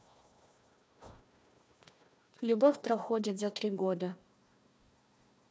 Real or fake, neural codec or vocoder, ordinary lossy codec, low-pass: fake; codec, 16 kHz, 1 kbps, FunCodec, trained on Chinese and English, 50 frames a second; none; none